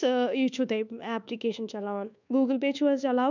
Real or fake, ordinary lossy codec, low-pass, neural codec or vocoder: fake; none; 7.2 kHz; codec, 24 kHz, 1.2 kbps, DualCodec